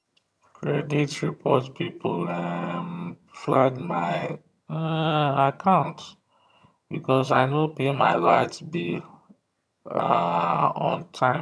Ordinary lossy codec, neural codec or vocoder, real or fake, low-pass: none; vocoder, 22.05 kHz, 80 mel bands, HiFi-GAN; fake; none